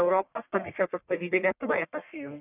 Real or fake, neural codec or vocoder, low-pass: fake; codec, 44.1 kHz, 1.7 kbps, Pupu-Codec; 3.6 kHz